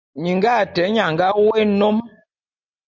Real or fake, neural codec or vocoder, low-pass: real; none; 7.2 kHz